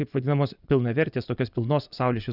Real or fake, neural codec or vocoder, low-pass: fake; vocoder, 24 kHz, 100 mel bands, Vocos; 5.4 kHz